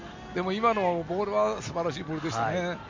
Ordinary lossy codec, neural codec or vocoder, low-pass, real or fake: MP3, 48 kbps; none; 7.2 kHz; real